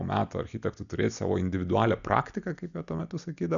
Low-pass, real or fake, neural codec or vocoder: 7.2 kHz; real; none